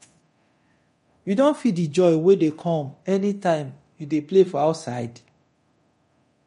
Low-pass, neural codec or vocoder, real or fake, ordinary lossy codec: 10.8 kHz; codec, 24 kHz, 0.9 kbps, DualCodec; fake; MP3, 48 kbps